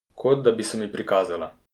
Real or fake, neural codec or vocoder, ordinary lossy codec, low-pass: real; none; Opus, 32 kbps; 19.8 kHz